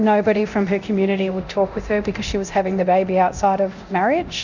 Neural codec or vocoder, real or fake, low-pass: codec, 24 kHz, 0.9 kbps, DualCodec; fake; 7.2 kHz